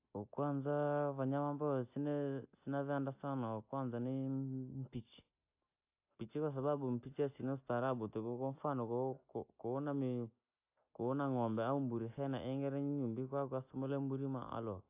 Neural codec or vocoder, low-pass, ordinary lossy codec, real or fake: none; 3.6 kHz; AAC, 32 kbps; real